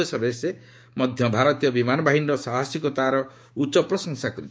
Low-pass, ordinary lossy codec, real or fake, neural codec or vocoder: none; none; fake; codec, 16 kHz, 6 kbps, DAC